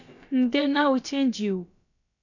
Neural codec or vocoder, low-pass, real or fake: codec, 16 kHz, about 1 kbps, DyCAST, with the encoder's durations; 7.2 kHz; fake